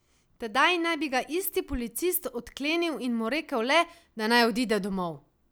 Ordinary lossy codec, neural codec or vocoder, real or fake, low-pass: none; none; real; none